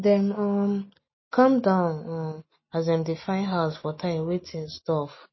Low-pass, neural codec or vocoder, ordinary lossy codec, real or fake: 7.2 kHz; none; MP3, 24 kbps; real